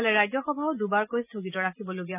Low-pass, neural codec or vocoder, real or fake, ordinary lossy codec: 3.6 kHz; none; real; none